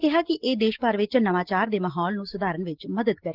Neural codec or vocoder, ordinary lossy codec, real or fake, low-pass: none; Opus, 16 kbps; real; 5.4 kHz